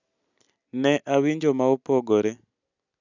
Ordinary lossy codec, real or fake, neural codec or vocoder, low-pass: none; real; none; 7.2 kHz